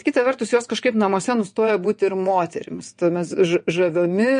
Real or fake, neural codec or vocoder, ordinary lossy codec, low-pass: fake; vocoder, 22.05 kHz, 80 mel bands, WaveNeXt; MP3, 48 kbps; 9.9 kHz